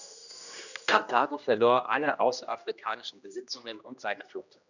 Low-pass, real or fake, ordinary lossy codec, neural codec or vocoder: 7.2 kHz; fake; none; codec, 16 kHz, 1 kbps, X-Codec, HuBERT features, trained on general audio